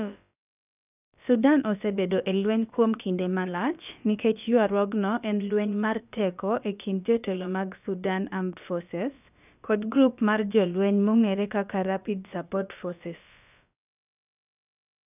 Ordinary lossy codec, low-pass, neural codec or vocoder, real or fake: none; 3.6 kHz; codec, 16 kHz, about 1 kbps, DyCAST, with the encoder's durations; fake